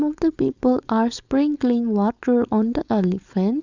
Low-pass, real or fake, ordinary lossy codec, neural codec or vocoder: 7.2 kHz; fake; Opus, 64 kbps; codec, 16 kHz, 4.8 kbps, FACodec